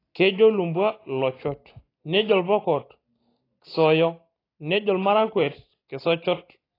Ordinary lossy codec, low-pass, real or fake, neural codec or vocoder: AAC, 24 kbps; 5.4 kHz; real; none